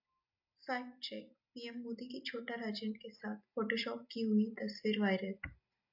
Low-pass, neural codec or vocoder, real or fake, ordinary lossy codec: 5.4 kHz; none; real; AAC, 48 kbps